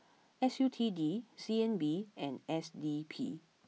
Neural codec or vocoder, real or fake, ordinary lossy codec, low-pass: none; real; none; none